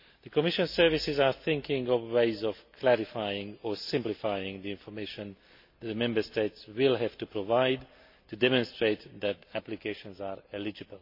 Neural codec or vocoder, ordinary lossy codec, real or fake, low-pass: none; MP3, 32 kbps; real; 5.4 kHz